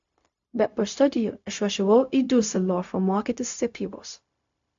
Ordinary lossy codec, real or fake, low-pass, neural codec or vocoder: AAC, 64 kbps; fake; 7.2 kHz; codec, 16 kHz, 0.4 kbps, LongCat-Audio-Codec